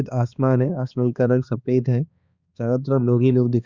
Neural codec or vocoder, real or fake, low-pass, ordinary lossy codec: codec, 16 kHz, 2 kbps, X-Codec, HuBERT features, trained on balanced general audio; fake; 7.2 kHz; Opus, 64 kbps